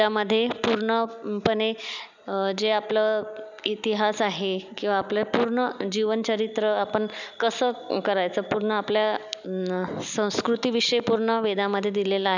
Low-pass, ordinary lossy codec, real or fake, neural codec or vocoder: 7.2 kHz; none; real; none